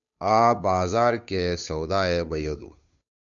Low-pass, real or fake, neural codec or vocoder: 7.2 kHz; fake; codec, 16 kHz, 2 kbps, FunCodec, trained on Chinese and English, 25 frames a second